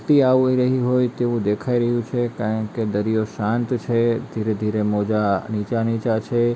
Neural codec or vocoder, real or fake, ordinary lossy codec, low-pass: none; real; none; none